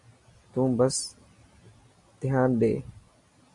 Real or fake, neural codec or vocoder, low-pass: real; none; 10.8 kHz